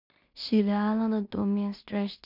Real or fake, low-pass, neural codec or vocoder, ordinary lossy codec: fake; 5.4 kHz; codec, 16 kHz in and 24 kHz out, 0.4 kbps, LongCat-Audio-Codec, two codebook decoder; none